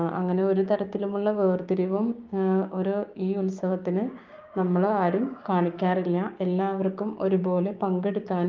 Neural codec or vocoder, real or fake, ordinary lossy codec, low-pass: codec, 16 kHz, 6 kbps, DAC; fake; Opus, 24 kbps; 7.2 kHz